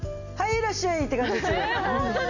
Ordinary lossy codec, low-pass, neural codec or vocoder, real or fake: none; 7.2 kHz; none; real